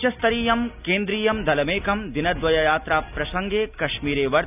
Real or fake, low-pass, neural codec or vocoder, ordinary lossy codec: real; 3.6 kHz; none; none